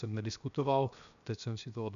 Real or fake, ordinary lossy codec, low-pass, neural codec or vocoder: fake; MP3, 96 kbps; 7.2 kHz; codec, 16 kHz, 0.7 kbps, FocalCodec